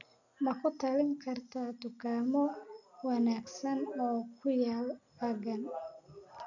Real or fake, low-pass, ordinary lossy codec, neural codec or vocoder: real; 7.2 kHz; none; none